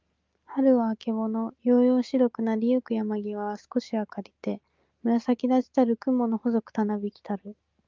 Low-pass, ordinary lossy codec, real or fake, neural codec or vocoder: 7.2 kHz; Opus, 32 kbps; real; none